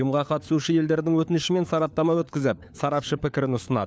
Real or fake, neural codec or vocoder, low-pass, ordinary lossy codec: fake; codec, 16 kHz, 4 kbps, FunCodec, trained on LibriTTS, 50 frames a second; none; none